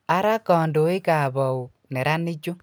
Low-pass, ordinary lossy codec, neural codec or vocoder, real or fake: none; none; none; real